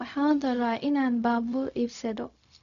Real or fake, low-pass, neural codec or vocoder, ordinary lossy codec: fake; 7.2 kHz; codec, 16 kHz, 0.4 kbps, LongCat-Audio-Codec; none